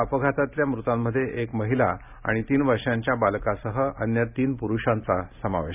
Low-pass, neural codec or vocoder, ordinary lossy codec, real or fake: 3.6 kHz; none; none; real